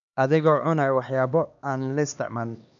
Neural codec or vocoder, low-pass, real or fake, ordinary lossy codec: codec, 16 kHz, 2 kbps, X-Codec, HuBERT features, trained on LibriSpeech; 7.2 kHz; fake; none